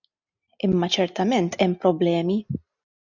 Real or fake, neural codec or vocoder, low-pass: real; none; 7.2 kHz